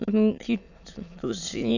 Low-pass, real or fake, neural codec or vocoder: 7.2 kHz; fake; autoencoder, 22.05 kHz, a latent of 192 numbers a frame, VITS, trained on many speakers